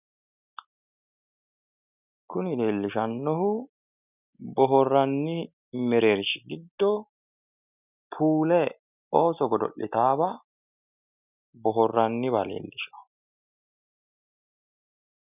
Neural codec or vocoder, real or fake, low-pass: none; real; 3.6 kHz